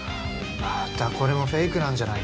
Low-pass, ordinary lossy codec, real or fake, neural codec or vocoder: none; none; real; none